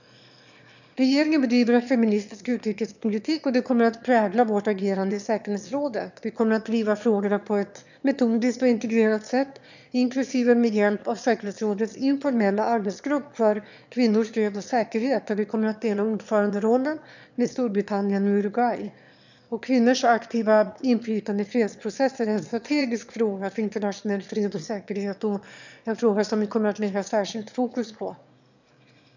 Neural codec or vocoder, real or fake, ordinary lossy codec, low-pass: autoencoder, 22.05 kHz, a latent of 192 numbers a frame, VITS, trained on one speaker; fake; none; 7.2 kHz